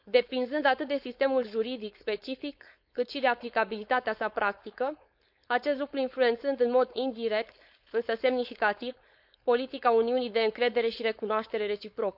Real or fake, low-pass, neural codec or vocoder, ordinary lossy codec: fake; 5.4 kHz; codec, 16 kHz, 4.8 kbps, FACodec; none